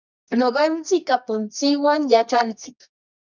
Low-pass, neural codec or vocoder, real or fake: 7.2 kHz; codec, 24 kHz, 0.9 kbps, WavTokenizer, medium music audio release; fake